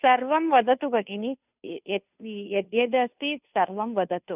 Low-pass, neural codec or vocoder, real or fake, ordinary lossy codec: 3.6 kHz; codec, 16 kHz, 2 kbps, FunCodec, trained on Chinese and English, 25 frames a second; fake; none